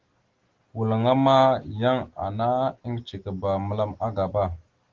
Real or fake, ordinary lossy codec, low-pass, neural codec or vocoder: real; Opus, 16 kbps; 7.2 kHz; none